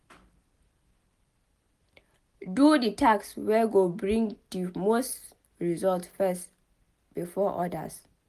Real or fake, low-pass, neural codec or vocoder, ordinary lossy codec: real; 14.4 kHz; none; Opus, 32 kbps